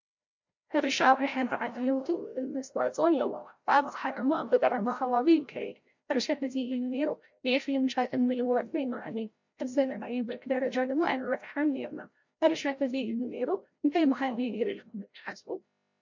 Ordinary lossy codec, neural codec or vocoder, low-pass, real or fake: MP3, 48 kbps; codec, 16 kHz, 0.5 kbps, FreqCodec, larger model; 7.2 kHz; fake